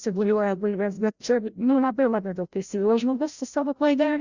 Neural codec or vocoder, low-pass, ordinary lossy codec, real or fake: codec, 16 kHz, 0.5 kbps, FreqCodec, larger model; 7.2 kHz; Opus, 64 kbps; fake